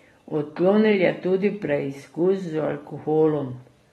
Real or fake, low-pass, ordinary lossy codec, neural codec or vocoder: real; 19.8 kHz; AAC, 32 kbps; none